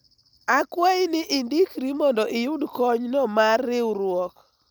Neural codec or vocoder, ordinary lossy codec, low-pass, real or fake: none; none; none; real